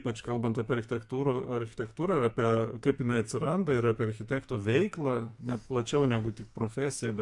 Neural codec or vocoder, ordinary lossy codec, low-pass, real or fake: codec, 44.1 kHz, 2.6 kbps, SNAC; MP3, 64 kbps; 10.8 kHz; fake